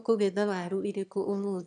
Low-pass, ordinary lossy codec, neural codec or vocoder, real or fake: 9.9 kHz; none; autoencoder, 22.05 kHz, a latent of 192 numbers a frame, VITS, trained on one speaker; fake